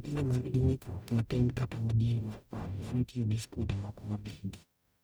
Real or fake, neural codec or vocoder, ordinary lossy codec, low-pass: fake; codec, 44.1 kHz, 0.9 kbps, DAC; none; none